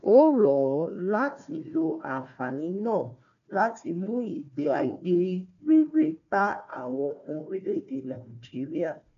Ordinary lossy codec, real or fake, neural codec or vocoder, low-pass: MP3, 96 kbps; fake; codec, 16 kHz, 1 kbps, FunCodec, trained on Chinese and English, 50 frames a second; 7.2 kHz